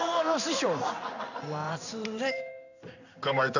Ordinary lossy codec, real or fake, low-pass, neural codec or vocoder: none; fake; 7.2 kHz; codec, 16 kHz in and 24 kHz out, 1 kbps, XY-Tokenizer